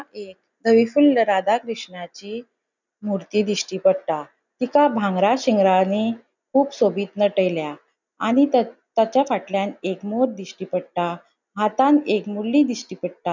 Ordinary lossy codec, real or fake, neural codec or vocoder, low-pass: none; real; none; 7.2 kHz